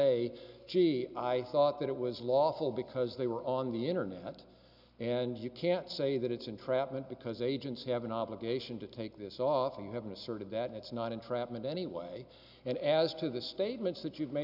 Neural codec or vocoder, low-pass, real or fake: none; 5.4 kHz; real